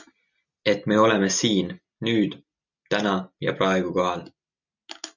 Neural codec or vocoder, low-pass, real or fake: none; 7.2 kHz; real